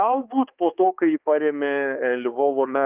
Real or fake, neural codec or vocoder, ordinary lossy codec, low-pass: fake; codec, 16 kHz, 2 kbps, X-Codec, HuBERT features, trained on balanced general audio; Opus, 24 kbps; 3.6 kHz